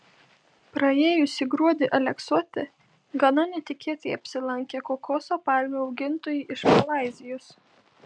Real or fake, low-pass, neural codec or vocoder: real; 9.9 kHz; none